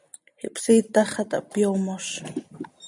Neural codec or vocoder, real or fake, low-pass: none; real; 10.8 kHz